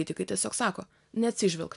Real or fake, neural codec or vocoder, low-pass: real; none; 10.8 kHz